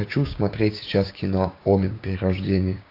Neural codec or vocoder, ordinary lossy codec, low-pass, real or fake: codec, 16 kHz, 6 kbps, DAC; AAC, 32 kbps; 5.4 kHz; fake